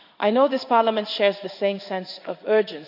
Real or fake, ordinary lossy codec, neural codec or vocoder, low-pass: fake; none; codec, 16 kHz in and 24 kHz out, 1 kbps, XY-Tokenizer; 5.4 kHz